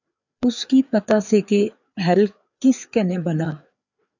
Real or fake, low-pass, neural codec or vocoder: fake; 7.2 kHz; codec, 16 kHz, 4 kbps, FreqCodec, larger model